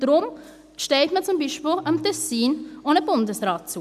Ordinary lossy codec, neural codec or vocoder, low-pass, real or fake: none; none; 14.4 kHz; real